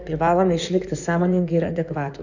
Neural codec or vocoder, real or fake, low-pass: codec, 16 kHz in and 24 kHz out, 2.2 kbps, FireRedTTS-2 codec; fake; 7.2 kHz